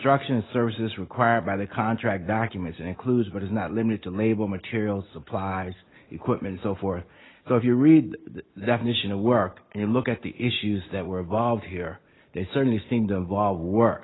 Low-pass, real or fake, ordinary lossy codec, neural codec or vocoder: 7.2 kHz; real; AAC, 16 kbps; none